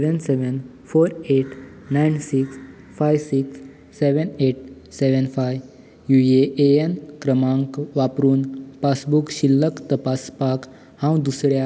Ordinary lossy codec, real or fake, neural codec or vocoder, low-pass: none; real; none; none